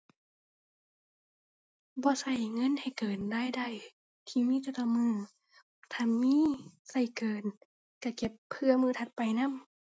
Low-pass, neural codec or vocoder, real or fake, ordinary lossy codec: none; none; real; none